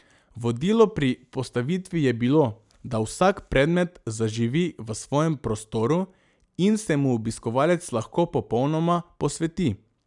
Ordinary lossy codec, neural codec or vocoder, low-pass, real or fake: none; none; 10.8 kHz; real